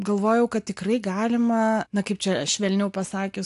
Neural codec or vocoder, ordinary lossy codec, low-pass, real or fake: none; AAC, 64 kbps; 10.8 kHz; real